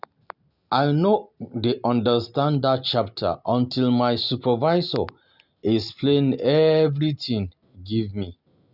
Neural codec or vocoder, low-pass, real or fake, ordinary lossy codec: none; 5.4 kHz; real; none